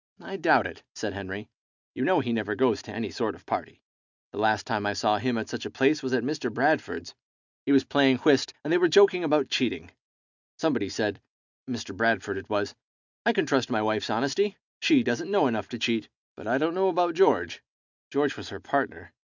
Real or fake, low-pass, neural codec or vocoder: real; 7.2 kHz; none